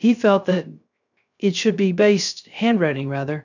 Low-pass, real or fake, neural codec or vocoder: 7.2 kHz; fake; codec, 16 kHz, 0.3 kbps, FocalCodec